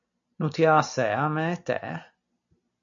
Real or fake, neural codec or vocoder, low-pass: real; none; 7.2 kHz